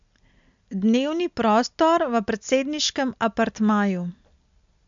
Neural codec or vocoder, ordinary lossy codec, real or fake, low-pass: none; MP3, 64 kbps; real; 7.2 kHz